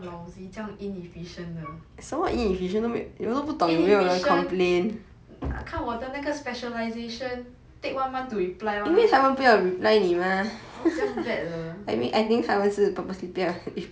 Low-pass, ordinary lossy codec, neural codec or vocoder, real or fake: none; none; none; real